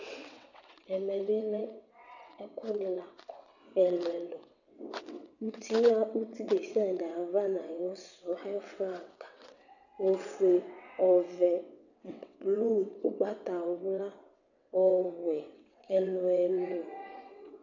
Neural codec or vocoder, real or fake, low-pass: vocoder, 22.05 kHz, 80 mel bands, WaveNeXt; fake; 7.2 kHz